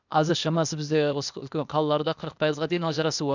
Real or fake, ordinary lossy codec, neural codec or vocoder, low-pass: fake; none; codec, 16 kHz, 0.8 kbps, ZipCodec; 7.2 kHz